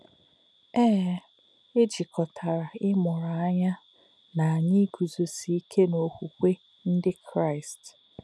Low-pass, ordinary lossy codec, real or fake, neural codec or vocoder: none; none; real; none